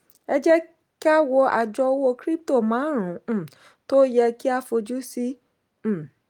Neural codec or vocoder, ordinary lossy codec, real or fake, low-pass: none; Opus, 32 kbps; real; 19.8 kHz